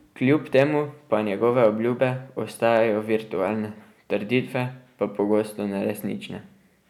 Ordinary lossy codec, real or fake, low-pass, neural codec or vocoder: none; real; 19.8 kHz; none